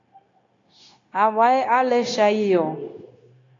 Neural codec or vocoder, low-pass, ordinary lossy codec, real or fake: codec, 16 kHz, 0.9 kbps, LongCat-Audio-Codec; 7.2 kHz; AAC, 32 kbps; fake